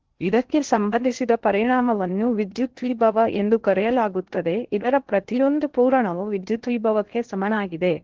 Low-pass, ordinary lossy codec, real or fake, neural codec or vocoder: 7.2 kHz; Opus, 16 kbps; fake; codec, 16 kHz in and 24 kHz out, 0.8 kbps, FocalCodec, streaming, 65536 codes